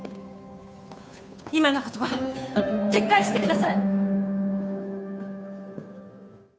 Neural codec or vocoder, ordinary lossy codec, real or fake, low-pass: codec, 16 kHz, 2 kbps, FunCodec, trained on Chinese and English, 25 frames a second; none; fake; none